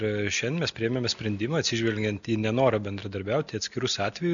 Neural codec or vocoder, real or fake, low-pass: none; real; 7.2 kHz